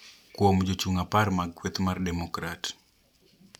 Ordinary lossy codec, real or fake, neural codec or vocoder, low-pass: none; fake; vocoder, 44.1 kHz, 128 mel bands every 256 samples, BigVGAN v2; 19.8 kHz